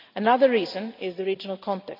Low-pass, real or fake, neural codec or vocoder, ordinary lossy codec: 5.4 kHz; real; none; MP3, 48 kbps